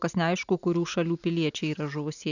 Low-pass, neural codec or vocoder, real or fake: 7.2 kHz; none; real